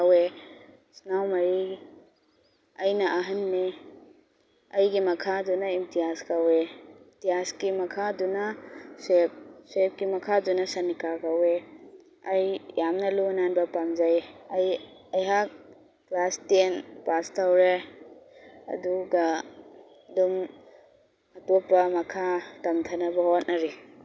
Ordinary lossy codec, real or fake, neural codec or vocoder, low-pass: none; real; none; none